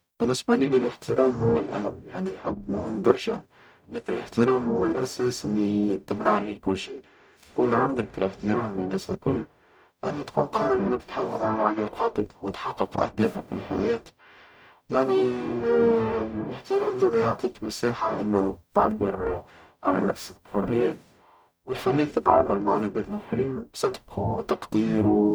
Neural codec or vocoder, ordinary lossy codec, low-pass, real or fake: codec, 44.1 kHz, 0.9 kbps, DAC; none; none; fake